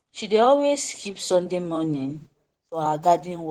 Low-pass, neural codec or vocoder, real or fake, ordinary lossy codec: 19.8 kHz; vocoder, 44.1 kHz, 128 mel bands, Pupu-Vocoder; fake; Opus, 16 kbps